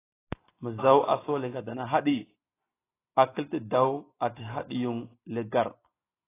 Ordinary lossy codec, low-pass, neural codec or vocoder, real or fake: AAC, 16 kbps; 3.6 kHz; none; real